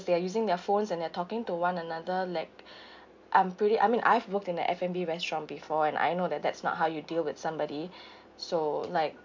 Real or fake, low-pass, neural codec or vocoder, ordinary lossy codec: real; 7.2 kHz; none; MP3, 48 kbps